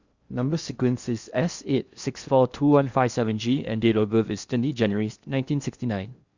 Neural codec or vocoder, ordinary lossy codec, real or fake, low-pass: codec, 16 kHz in and 24 kHz out, 0.8 kbps, FocalCodec, streaming, 65536 codes; Opus, 64 kbps; fake; 7.2 kHz